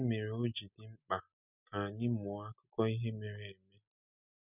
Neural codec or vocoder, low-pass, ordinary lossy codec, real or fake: none; 3.6 kHz; none; real